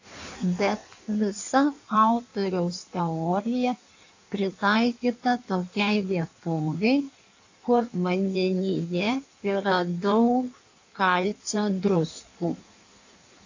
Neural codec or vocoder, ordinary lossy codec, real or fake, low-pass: codec, 16 kHz in and 24 kHz out, 1.1 kbps, FireRedTTS-2 codec; AAC, 48 kbps; fake; 7.2 kHz